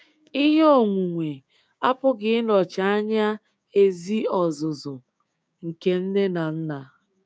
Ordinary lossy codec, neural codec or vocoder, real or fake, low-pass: none; codec, 16 kHz, 6 kbps, DAC; fake; none